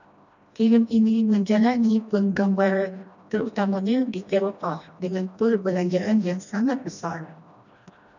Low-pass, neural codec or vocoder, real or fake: 7.2 kHz; codec, 16 kHz, 1 kbps, FreqCodec, smaller model; fake